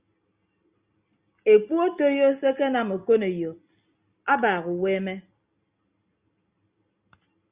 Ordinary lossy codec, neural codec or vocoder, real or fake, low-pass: Opus, 64 kbps; none; real; 3.6 kHz